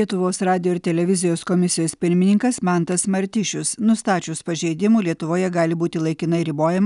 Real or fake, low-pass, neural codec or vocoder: real; 10.8 kHz; none